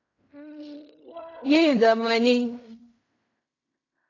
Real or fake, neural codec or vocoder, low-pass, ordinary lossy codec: fake; codec, 16 kHz in and 24 kHz out, 0.4 kbps, LongCat-Audio-Codec, fine tuned four codebook decoder; 7.2 kHz; AAC, 32 kbps